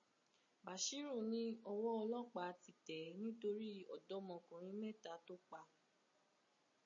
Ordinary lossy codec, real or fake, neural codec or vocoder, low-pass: MP3, 32 kbps; real; none; 7.2 kHz